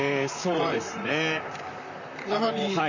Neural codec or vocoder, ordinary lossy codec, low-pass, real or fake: vocoder, 44.1 kHz, 128 mel bands, Pupu-Vocoder; none; 7.2 kHz; fake